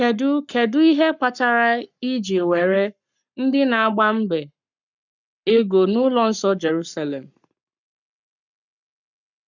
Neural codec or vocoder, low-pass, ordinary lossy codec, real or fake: codec, 44.1 kHz, 7.8 kbps, Pupu-Codec; 7.2 kHz; none; fake